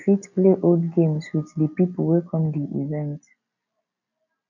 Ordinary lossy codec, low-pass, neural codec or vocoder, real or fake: none; 7.2 kHz; none; real